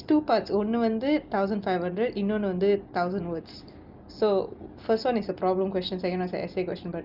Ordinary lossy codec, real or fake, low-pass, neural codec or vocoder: Opus, 24 kbps; real; 5.4 kHz; none